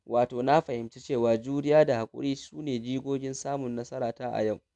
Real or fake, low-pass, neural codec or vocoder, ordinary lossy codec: real; 10.8 kHz; none; none